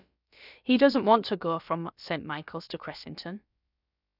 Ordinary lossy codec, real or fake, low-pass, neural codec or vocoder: none; fake; 5.4 kHz; codec, 16 kHz, about 1 kbps, DyCAST, with the encoder's durations